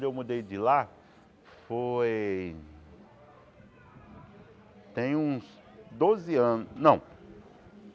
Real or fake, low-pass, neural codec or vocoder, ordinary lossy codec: real; none; none; none